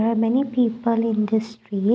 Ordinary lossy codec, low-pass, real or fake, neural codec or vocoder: none; none; real; none